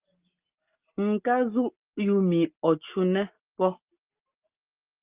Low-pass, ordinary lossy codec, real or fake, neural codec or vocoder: 3.6 kHz; Opus, 24 kbps; real; none